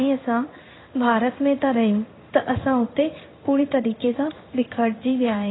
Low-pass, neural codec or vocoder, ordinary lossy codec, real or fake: 7.2 kHz; codec, 16 kHz in and 24 kHz out, 1 kbps, XY-Tokenizer; AAC, 16 kbps; fake